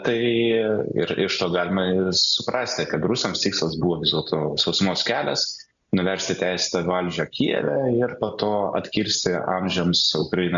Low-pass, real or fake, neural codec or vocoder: 7.2 kHz; real; none